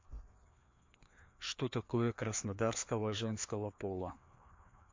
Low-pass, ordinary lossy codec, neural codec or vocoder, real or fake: 7.2 kHz; MP3, 48 kbps; codec, 16 kHz, 2 kbps, FreqCodec, larger model; fake